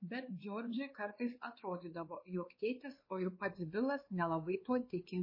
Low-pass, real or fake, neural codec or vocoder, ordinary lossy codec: 5.4 kHz; fake; codec, 16 kHz, 2 kbps, X-Codec, WavLM features, trained on Multilingual LibriSpeech; MP3, 24 kbps